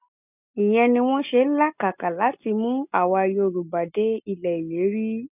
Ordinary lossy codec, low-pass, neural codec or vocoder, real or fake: none; 3.6 kHz; none; real